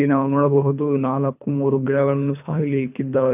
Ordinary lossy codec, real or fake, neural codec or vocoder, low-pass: none; fake; codec, 24 kHz, 3 kbps, HILCodec; 3.6 kHz